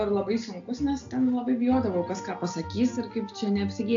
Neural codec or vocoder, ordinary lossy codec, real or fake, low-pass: none; AAC, 48 kbps; real; 7.2 kHz